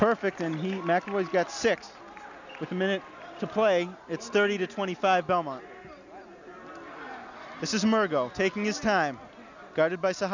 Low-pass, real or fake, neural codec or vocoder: 7.2 kHz; real; none